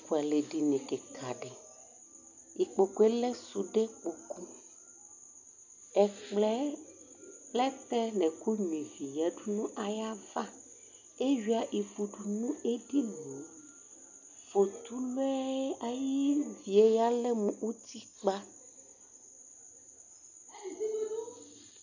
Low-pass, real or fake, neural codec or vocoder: 7.2 kHz; real; none